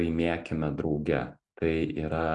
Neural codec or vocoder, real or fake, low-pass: none; real; 10.8 kHz